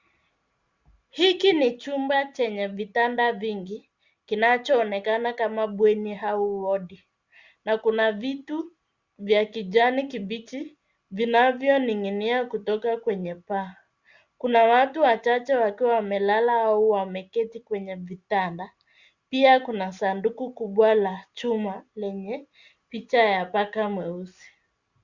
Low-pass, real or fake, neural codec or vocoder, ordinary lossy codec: 7.2 kHz; real; none; Opus, 64 kbps